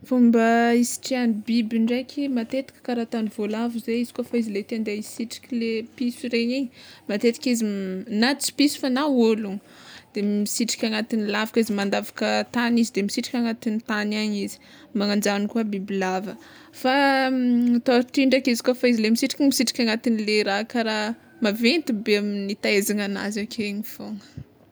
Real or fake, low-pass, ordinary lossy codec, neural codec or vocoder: real; none; none; none